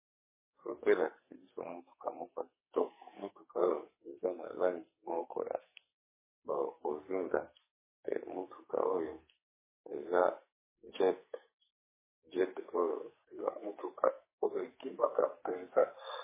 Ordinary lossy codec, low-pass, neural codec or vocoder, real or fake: MP3, 16 kbps; 3.6 kHz; codec, 44.1 kHz, 2.6 kbps, SNAC; fake